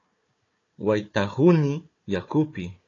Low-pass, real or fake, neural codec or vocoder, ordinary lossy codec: 7.2 kHz; fake; codec, 16 kHz, 4 kbps, FunCodec, trained on Chinese and English, 50 frames a second; AAC, 32 kbps